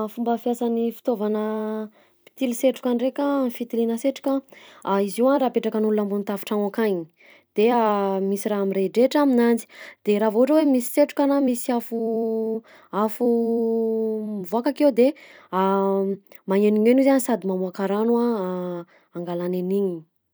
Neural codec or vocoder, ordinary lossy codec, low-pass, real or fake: vocoder, 44.1 kHz, 128 mel bands every 256 samples, BigVGAN v2; none; none; fake